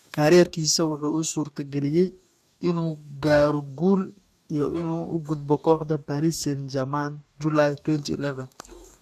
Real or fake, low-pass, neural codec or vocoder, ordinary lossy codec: fake; 14.4 kHz; codec, 44.1 kHz, 2.6 kbps, DAC; none